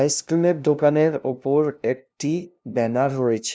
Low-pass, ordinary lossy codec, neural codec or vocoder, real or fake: none; none; codec, 16 kHz, 0.5 kbps, FunCodec, trained on LibriTTS, 25 frames a second; fake